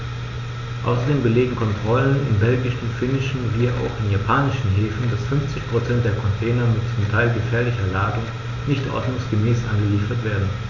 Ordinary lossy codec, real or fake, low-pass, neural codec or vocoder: none; real; 7.2 kHz; none